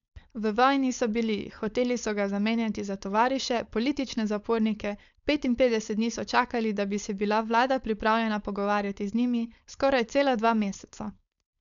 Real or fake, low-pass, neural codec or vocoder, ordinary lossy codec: fake; 7.2 kHz; codec, 16 kHz, 4.8 kbps, FACodec; none